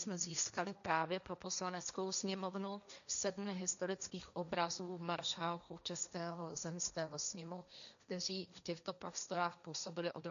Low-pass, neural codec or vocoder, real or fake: 7.2 kHz; codec, 16 kHz, 1.1 kbps, Voila-Tokenizer; fake